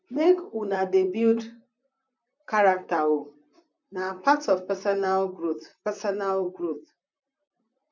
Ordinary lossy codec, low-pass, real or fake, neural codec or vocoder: none; 7.2 kHz; fake; vocoder, 44.1 kHz, 128 mel bands, Pupu-Vocoder